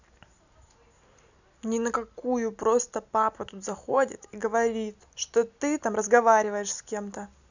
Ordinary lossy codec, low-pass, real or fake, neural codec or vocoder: none; 7.2 kHz; real; none